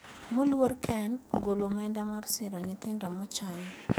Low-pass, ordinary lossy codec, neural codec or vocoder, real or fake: none; none; codec, 44.1 kHz, 2.6 kbps, SNAC; fake